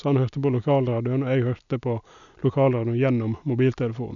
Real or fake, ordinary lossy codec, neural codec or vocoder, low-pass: real; none; none; 7.2 kHz